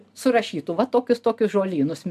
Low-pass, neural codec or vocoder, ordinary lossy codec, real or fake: 14.4 kHz; none; MP3, 96 kbps; real